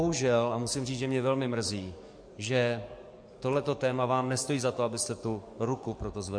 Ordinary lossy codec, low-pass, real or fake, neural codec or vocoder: MP3, 48 kbps; 9.9 kHz; fake; codec, 44.1 kHz, 7.8 kbps, DAC